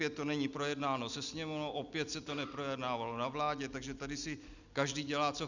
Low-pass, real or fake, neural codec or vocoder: 7.2 kHz; real; none